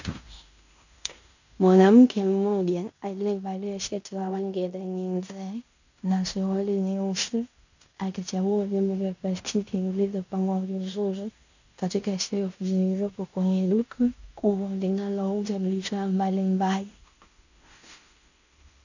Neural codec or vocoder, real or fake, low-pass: codec, 16 kHz in and 24 kHz out, 0.9 kbps, LongCat-Audio-Codec, fine tuned four codebook decoder; fake; 7.2 kHz